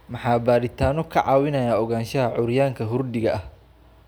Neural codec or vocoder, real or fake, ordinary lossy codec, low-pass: none; real; none; none